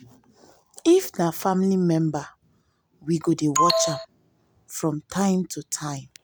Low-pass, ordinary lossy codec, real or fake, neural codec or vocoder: none; none; real; none